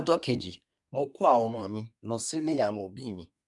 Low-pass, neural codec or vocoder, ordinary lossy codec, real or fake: 10.8 kHz; codec, 24 kHz, 1 kbps, SNAC; MP3, 96 kbps; fake